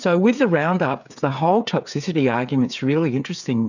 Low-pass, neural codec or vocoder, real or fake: 7.2 kHz; codec, 16 kHz, 8 kbps, FreqCodec, smaller model; fake